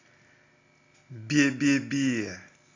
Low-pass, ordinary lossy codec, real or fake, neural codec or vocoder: 7.2 kHz; AAC, 32 kbps; real; none